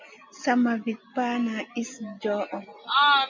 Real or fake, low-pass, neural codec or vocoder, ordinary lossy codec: real; 7.2 kHz; none; MP3, 64 kbps